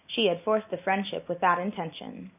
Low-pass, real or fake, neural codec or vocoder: 3.6 kHz; real; none